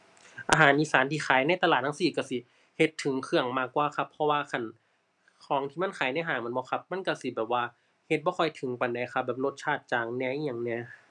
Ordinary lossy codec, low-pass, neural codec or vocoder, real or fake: none; 10.8 kHz; none; real